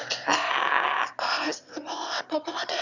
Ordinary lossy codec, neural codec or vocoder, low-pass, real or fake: none; autoencoder, 22.05 kHz, a latent of 192 numbers a frame, VITS, trained on one speaker; 7.2 kHz; fake